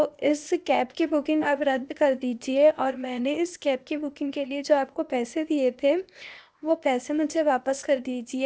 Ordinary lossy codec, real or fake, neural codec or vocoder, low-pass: none; fake; codec, 16 kHz, 0.8 kbps, ZipCodec; none